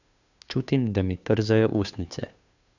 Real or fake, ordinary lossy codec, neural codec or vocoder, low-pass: fake; none; codec, 16 kHz, 2 kbps, FunCodec, trained on Chinese and English, 25 frames a second; 7.2 kHz